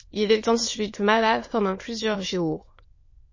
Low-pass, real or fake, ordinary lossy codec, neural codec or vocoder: 7.2 kHz; fake; MP3, 32 kbps; autoencoder, 22.05 kHz, a latent of 192 numbers a frame, VITS, trained on many speakers